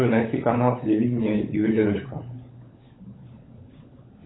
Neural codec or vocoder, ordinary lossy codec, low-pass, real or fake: codec, 16 kHz, 16 kbps, FunCodec, trained on LibriTTS, 50 frames a second; AAC, 16 kbps; 7.2 kHz; fake